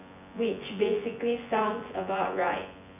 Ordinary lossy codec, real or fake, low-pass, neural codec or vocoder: none; fake; 3.6 kHz; vocoder, 24 kHz, 100 mel bands, Vocos